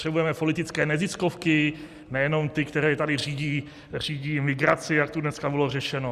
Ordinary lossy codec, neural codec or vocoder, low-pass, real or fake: Opus, 64 kbps; none; 14.4 kHz; real